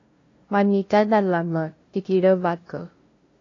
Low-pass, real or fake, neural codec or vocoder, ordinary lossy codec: 7.2 kHz; fake; codec, 16 kHz, 0.5 kbps, FunCodec, trained on LibriTTS, 25 frames a second; AAC, 32 kbps